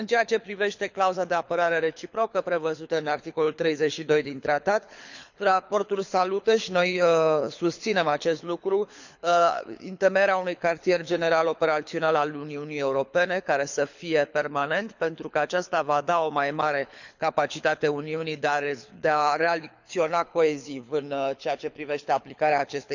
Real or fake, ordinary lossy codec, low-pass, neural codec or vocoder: fake; none; 7.2 kHz; codec, 24 kHz, 6 kbps, HILCodec